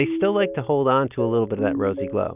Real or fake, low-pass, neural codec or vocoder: real; 3.6 kHz; none